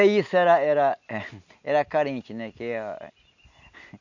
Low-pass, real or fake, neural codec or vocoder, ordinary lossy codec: 7.2 kHz; real; none; none